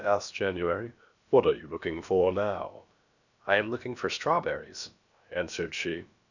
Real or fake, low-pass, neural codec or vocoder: fake; 7.2 kHz; codec, 16 kHz, about 1 kbps, DyCAST, with the encoder's durations